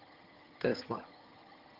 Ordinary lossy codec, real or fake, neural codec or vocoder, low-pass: Opus, 32 kbps; fake; vocoder, 22.05 kHz, 80 mel bands, HiFi-GAN; 5.4 kHz